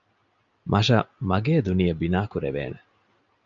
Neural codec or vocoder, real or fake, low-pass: none; real; 7.2 kHz